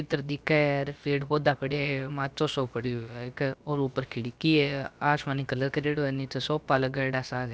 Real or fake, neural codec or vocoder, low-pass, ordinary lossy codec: fake; codec, 16 kHz, about 1 kbps, DyCAST, with the encoder's durations; none; none